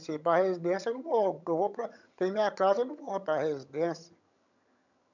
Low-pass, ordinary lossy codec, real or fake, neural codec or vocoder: 7.2 kHz; none; fake; vocoder, 22.05 kHz, 80 mel bands, HiFi-GAN